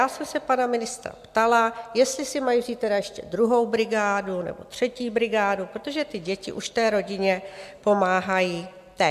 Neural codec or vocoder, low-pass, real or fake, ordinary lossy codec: none; 14.4 kHz; real; MP3, 96 kbps